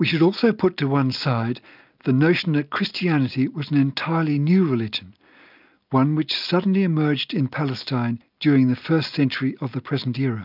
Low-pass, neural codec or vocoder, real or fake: 5.4 kHz; none; real